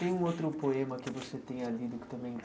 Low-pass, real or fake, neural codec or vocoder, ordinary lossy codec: none; real; none; none